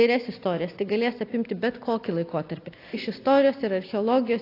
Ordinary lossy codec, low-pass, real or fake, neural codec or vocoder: AAC, 32 kbps; 5.4 kHz; real; none